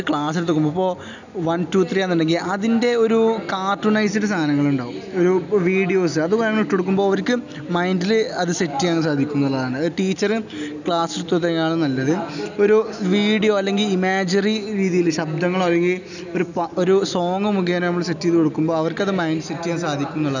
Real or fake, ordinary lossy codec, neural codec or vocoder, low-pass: real; none; none; 7.2 kHz